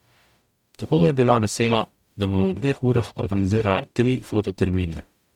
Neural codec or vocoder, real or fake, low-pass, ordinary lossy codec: codec, 44.1 kHz, 0.9 kbps, DAC; fake; 19.8 kHz; MP3, 96 kbps